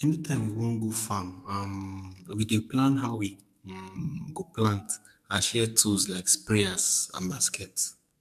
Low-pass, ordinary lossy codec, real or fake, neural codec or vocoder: 14.4 kHz; MP3, 96 kbps; fake; codec, 44.1 kHz, 2.6 kbps, SNAC